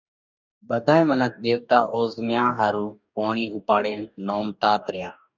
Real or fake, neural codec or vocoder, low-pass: fake; codec, 44.1 kHz, 2.6 kbps, DAC; 7.2 kHz